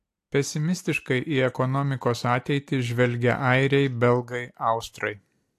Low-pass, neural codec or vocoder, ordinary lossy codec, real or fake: 14.4 kHz; none; AAC, 64 kbps; real